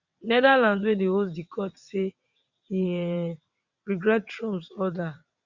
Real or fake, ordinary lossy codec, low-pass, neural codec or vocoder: fake; Opus, 64 kbps; 7.2 kHz; vocoder, 22.05 kHz, 80 mel bands, WaveNeXt